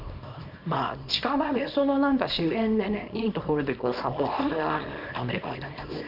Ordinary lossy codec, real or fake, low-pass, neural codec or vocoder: none; fake; 5.4 kHz; codec, 24 kHz, 0.9 kbps, WavTokenizer, small release